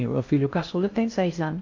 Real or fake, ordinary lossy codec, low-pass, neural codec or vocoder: fake; AAC, 48 kbps; 7.2 kHz; codec, 16 kHz in and 24 kHz out, 0.6 kbps, FocalCodec, streaming, 2048 codes